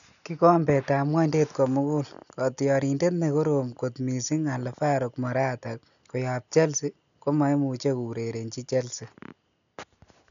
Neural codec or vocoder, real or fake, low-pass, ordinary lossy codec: none; real; 7.2 kHz; none